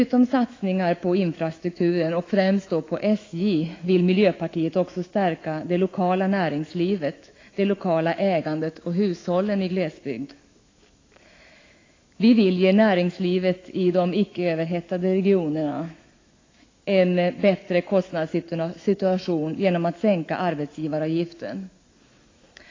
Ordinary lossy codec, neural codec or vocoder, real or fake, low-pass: AAC, 32 kbps; autoencoder, 48 kHz, 128 numbers a frame, DAC-VAE, trained on Japanese speech; fake; 7.2 kHz